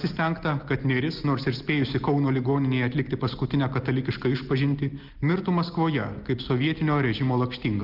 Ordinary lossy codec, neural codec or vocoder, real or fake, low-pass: Opus, 24 kbps; none; real; 5.4 kHz